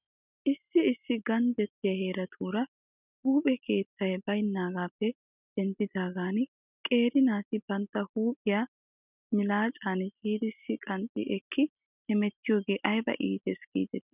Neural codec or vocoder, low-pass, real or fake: none; 3.6 kHz; real